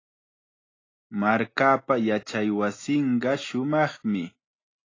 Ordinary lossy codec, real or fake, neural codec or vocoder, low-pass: AAC, 32 kbps; real; none; 7.2 kHz